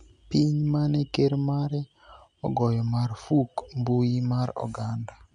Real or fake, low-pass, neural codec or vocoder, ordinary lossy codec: real; 10.8 kHz; none; none